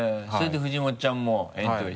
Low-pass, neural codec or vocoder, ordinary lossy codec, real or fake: none; none; none; real